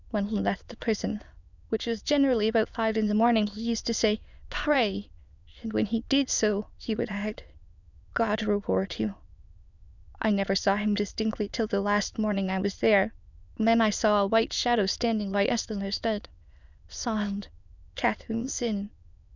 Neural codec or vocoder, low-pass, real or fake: autoencoder, 22.05 kHz, a latent of 192 numbers a frame, VITS, trained on many speakers; 7.2 kHz; fake